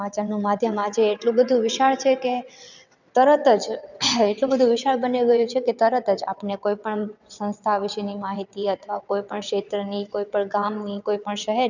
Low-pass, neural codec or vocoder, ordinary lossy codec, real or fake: 7.2 kHz; vocoder, 22.05 kHz, 80 mel bands, WaveNeXt; none; fake